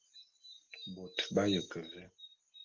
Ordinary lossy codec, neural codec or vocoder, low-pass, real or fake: Opus, 16 kbps; none; 7.2 kHz; real